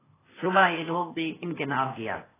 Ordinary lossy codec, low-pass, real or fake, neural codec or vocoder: AAC, 16 kbps; 3.6 kHz; fake; codec, 16 kHz, 0.8 kbps, ZipCodec